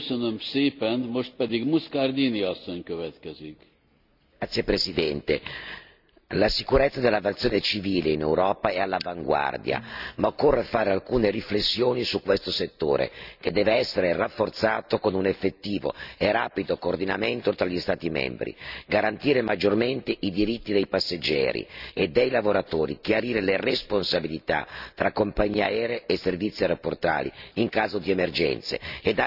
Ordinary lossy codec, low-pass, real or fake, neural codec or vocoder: none; 5.4 kHz; real; none